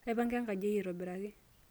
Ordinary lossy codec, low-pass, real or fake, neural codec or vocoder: none; none; real; none